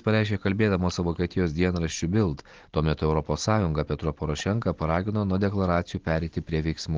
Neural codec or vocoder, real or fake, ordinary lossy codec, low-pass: none; real; Opus, 16 kbps; 7.2 kHz